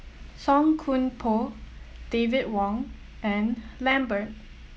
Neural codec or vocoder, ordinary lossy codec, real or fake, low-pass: none; none; real; none